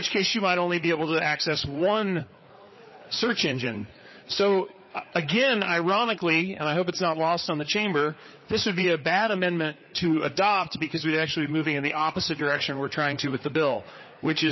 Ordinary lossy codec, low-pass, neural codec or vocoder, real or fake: MP3, 24 kbps; 7.2 kHz; codec, 16 kHz, 4 kbps, FreqCodec, larger model; fake